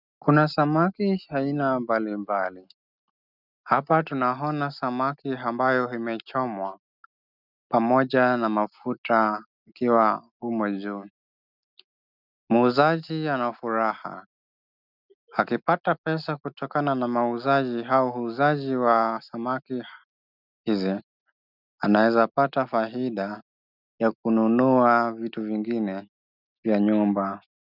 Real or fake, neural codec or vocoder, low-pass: real; none; 5.4 kHz